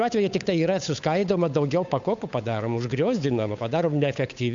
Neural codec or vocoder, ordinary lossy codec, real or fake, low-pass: codec, 16 kHz, 8 kbps, FunCodec, trained on Chinese and English, 25 frames a second; MP3, 64 kbps; fake; 7.2 kHz